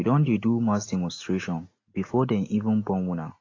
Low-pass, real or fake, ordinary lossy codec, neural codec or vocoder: 7.2 kHz; real; AAC, 32 kbps; none